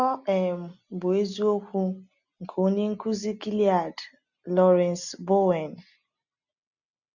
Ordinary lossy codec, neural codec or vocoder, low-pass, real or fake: none; none; 7.2 kHz; real